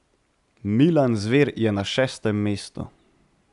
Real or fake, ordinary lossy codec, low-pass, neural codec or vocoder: real; none; 10.8 kHz; none